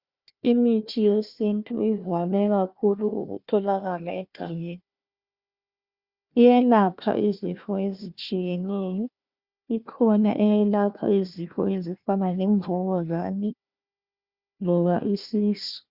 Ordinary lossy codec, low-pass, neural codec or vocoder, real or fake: Opus, 64 kbps; 5.4 kHz; codec, 16 kHz, 1 kbps, FunCodec, trained on Chinese and English, 50 frames a second; fake